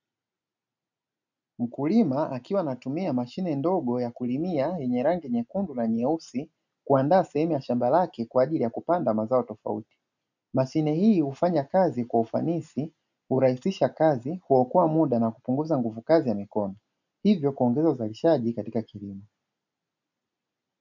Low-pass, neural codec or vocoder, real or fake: 7.2 kHz; none; real